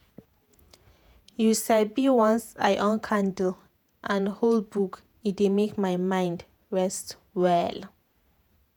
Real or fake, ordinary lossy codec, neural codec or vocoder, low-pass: fake; none; vocoder, 48 kHz, 128 mel bands, Vocos; none